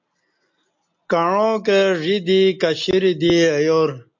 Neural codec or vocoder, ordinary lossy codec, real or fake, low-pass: none; MP3, 48 kbps; real; 7.2 kHz